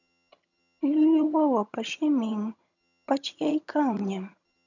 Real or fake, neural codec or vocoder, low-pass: fake; vocoder, 22.05 kHz, 80 mel bands, HiFi-GAN; 7.2 kHz